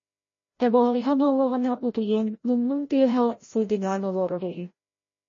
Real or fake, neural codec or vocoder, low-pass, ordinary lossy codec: fake; codec, 16 kHz, 0.5 kbps, FreqCodec, larger model; 7.2 kHz; MP3, 32 kbps